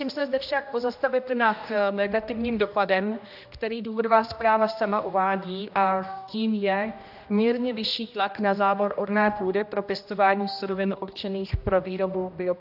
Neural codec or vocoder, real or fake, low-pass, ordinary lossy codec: codec, 16 kHz, 1 kbps, X-Codec, HuBERT features, trained on general audio; fake; 5.4 kHz; AAC, 48 kbps